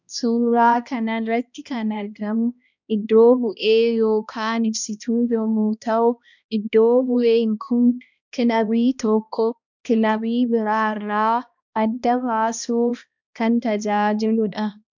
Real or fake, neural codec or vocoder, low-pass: fake; codec, 16 kHz, 1 kbps, X-Codec, HuBERT features, trained on balanced general audio; 7.2 kHz